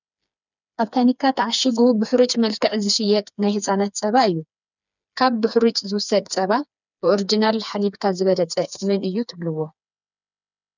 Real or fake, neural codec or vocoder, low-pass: fake; codec, 16 kHz, 4 kbps, FreqCodec, smaller model; 7.2 kHz